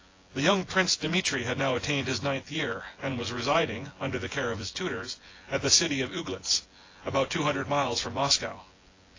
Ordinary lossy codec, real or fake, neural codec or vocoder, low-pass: AAC, 32 kbps; fake; vocoder, 24 kHz, 100 mel bands, Vocos; 7.2 kHz